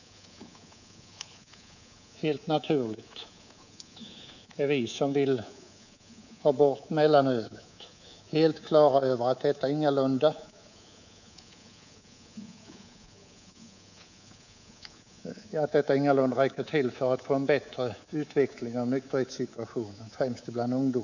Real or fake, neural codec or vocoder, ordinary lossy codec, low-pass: fake; codec, 24 kHz, 3.1 kbps, DualCodec; none; 7.2 kHz